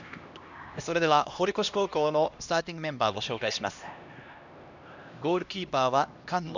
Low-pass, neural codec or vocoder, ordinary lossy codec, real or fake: 7.2 kHz; codec, 16 kHz, 1 kbps, X-Codec, HuBERT features, trained on LibriSpeech; none; fake